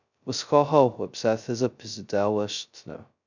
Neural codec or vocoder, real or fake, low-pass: codec, 16 kHz, 0.2 kbps, FocalCodec; fake; 7.2 kHz